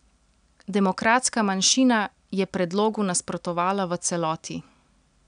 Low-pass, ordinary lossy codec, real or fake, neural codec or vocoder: 9.9 kHz; none; real; none